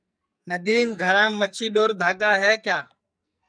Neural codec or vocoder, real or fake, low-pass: codec, 44.1 kHz, 2.6 kbps, SNAC; fake; 9.9 kHz